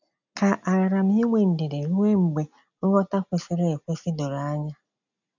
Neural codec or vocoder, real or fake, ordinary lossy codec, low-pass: none; real; none; 7.2 kHz